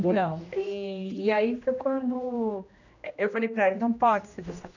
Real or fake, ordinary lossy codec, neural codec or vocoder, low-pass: fake; none; codec, 16 kHz, 1 kbps, X-Codec, HuBERT features, trained on general audio; 7.2 kHz